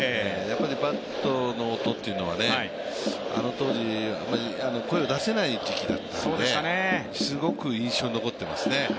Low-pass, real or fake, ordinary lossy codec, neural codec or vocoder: none; real; none; none